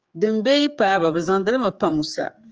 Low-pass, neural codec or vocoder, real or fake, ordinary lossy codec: 7.2 kHz; codec, 16 kHz, 4 kbps, X-Codec, HuBERT features, trained on general audio; fake; Opus, 24 kbps